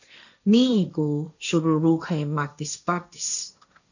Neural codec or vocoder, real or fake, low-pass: codec, 16 kHz, 1.1 kbps, Voila-Tokenizer; fake; 7.2 kHz